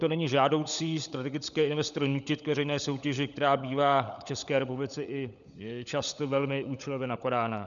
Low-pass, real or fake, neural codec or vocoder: 7.2 kHz; fake; codec, 16 kHz, 16 kbps, FunCodec, trained on LibriTTS, 50 frames a second